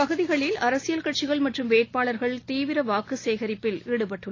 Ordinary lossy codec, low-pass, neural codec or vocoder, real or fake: AAC, 32 kbps; 7.2 kHz; none; real